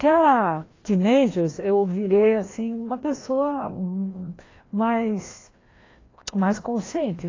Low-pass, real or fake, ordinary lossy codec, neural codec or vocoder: 7.2 kHz; fake; AAC, 32 kbps; codec, 16 kHz, 1 kbps, FreqCodec, larger model